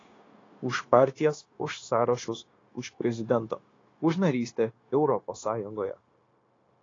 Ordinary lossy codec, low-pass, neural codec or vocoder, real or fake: AAC, 32 kbps; 7.2 kHz; codec, 16 kHz, 0.9 kbps, LongCat-Audio-Codec; fake